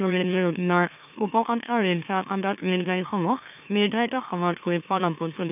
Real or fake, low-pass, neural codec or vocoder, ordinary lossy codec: fake; 3.6 kHz; autoencoder, 44.1 kHz, a latent of 192 numbers a frame, MeloTTS; none